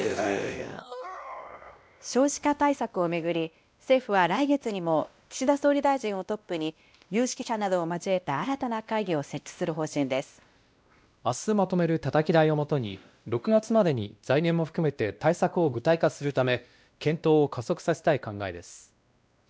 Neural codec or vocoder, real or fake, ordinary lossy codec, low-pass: codec, 16 kHz, 1 kbps, X-Codec, WavLM features, trained on Multilingual LibriSpeech; fake; none; none